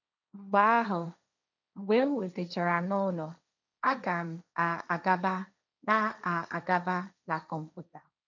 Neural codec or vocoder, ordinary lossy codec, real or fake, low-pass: codec, 16 kHz, 1.1 kbps, Voila-Tokenizer; none; fake; 7.2 kHz